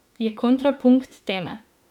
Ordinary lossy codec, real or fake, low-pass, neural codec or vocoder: none; fake; 19.8 kHz; autoencoder, 48 kHz, 32 numbers a frame, DAC-VAE, trained on Japanese speech